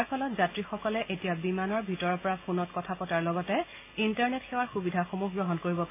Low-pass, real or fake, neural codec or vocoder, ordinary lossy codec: 3.6 kHz; real; none; AAC, 24 kbps